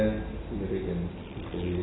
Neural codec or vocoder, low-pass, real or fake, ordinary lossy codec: none; 7.2 kHz; real; AAC, 16 kbps